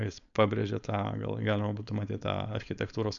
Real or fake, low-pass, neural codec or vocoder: fake; 7.2 kHz; codec, 16 kHz, 4.8 kbps, FACodec